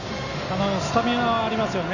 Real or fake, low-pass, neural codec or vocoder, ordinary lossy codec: real; 7.2 kHz; none; none